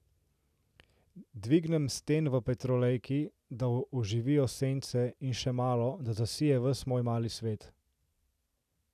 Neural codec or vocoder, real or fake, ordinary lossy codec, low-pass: none; real; none; 14.4 kHz